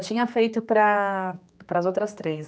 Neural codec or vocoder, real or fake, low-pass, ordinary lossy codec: codec, 16 kHz, 2 kbps, X-Codec, HuBERT features, trained on general audio; fake; none; none